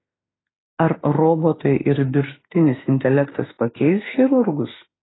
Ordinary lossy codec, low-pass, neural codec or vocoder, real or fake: AAC, 16 kbps; 7.2 kHz; autoencoder, 48 kHz, 32 numbers a frame, DAC-VAE, trained on Japanese speech; fake